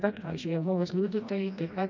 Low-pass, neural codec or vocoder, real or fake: 7.2 kHz; codec, 16 kHz, 1 kbps, FreqCodec, smaller model; fake